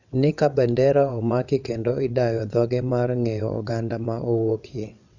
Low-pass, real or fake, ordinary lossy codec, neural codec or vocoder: 7.2 kHz; fake; none; vocoder, 22.05 kHz, 80 mel bands, WaveNeXt